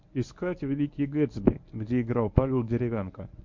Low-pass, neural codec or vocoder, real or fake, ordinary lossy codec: 7.2 kHz; codec, 24 kHz, 0.9 kbps, WavTokenizer, medium speech release version 1; fake; MP3, 48 kbps